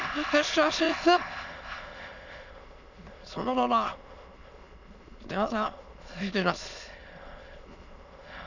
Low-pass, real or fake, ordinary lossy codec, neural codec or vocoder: 7.2 kHz; fake; none; autoencoder, 22.05 kHz, a latent of 192 numbers a frame, VITS, trained on many speakers